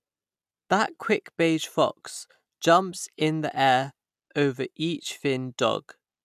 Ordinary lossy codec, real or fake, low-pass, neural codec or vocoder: none; real; 14.4 kHz; none